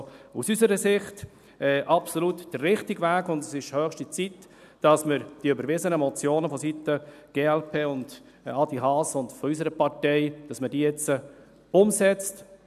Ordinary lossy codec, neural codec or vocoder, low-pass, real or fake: none; none; 14.4 kHz; real